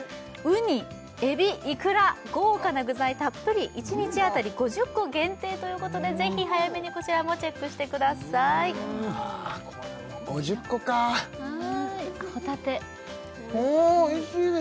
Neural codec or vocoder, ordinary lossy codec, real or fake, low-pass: none; none; real; none